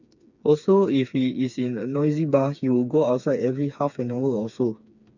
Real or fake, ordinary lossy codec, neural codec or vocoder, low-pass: fake; none; codec, 16 kHz, 4 kbps, FreqCodec, smaller model; 7.2 kHz